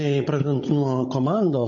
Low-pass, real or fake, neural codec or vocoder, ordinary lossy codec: 7.2 kHz; fake; codec, 16 kHz, 16 kbps, FunCodec, trained on Chinese and English, 50 frames a second; MP3, 32 kbps